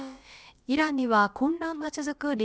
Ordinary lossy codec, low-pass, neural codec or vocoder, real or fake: none; none; codec, 16 kHz, about 1 kbps, DyCAST, with the encoder's durations; fake